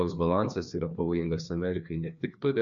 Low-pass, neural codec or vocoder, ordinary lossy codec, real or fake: 7.2 kHz; codec, 16 kHz, 2 kbps, FreqCodec, larger model; MP3, 64 kbps; fake